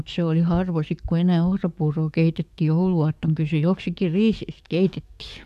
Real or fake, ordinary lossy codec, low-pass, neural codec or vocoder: fake; none; 14.4 kHz; codec, 44.1 kHz, 7.8 kbps, DAC